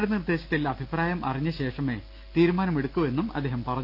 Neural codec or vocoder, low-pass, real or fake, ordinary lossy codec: none; 5.4 kHz; real; none